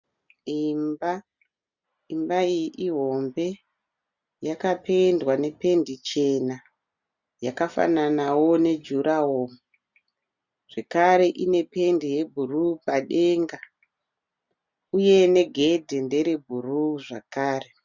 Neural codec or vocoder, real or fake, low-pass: none; real; 7.2 kHz